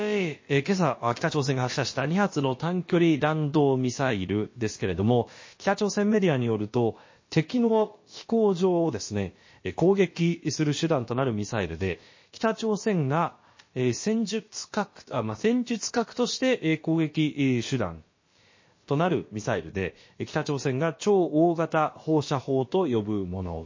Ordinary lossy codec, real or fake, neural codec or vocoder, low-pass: MP3, 32 kbps; fake; codec, 16 kHz, about 1 kbps, DyCAST, with the encoder's durations; 7.2 kHz